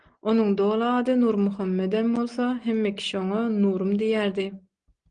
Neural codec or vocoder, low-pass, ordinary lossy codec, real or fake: none; 9.9 kHz; Opus, 24 kbps; real